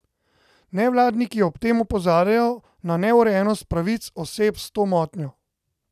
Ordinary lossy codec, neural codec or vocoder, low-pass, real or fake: none; none; 14.4 kHz; real